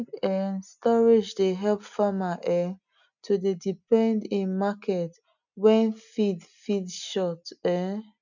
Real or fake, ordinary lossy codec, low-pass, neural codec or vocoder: real; none; 7.2 kHz; none